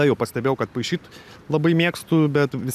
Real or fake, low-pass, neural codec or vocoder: real; 14.4 kHz; none